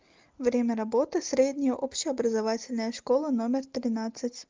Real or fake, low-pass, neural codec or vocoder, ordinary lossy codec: real; 7.2 kHz; none; Opus, 24 kbps